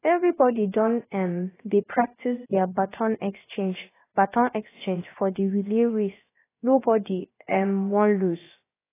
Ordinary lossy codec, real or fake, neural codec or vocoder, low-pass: AAC, 16 kbps; fake; codec, 16 kHz, about 1 kbps, DyCAST, with the encoder's durations; 3.6 kHz